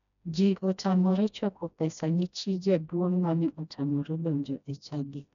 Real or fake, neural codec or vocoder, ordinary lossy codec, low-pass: fake; codec, 16 kHz, 1 kbps, FreqCodec, smaller model; none; 7.2 kHz